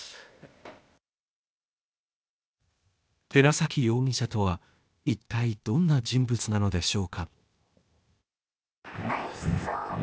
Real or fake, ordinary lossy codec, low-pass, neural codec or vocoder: fake; none; none; codec, 16 kHz, 0.8 kbps, ZipCodec